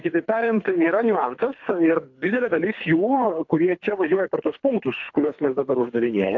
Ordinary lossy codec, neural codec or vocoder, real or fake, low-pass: AAC, 48 kbps; codec, 24 kHz, 3 kbps, HILCodec; fake; 7.2 kHz